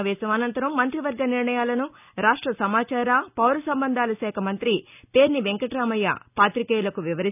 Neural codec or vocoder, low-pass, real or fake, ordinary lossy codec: none; 3.6 kHz; real; none